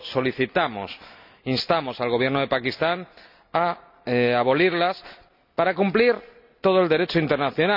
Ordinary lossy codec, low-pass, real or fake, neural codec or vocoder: none; 5.4 kHz; real; none